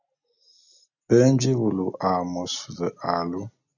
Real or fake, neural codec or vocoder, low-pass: real; none; 7.2 kHz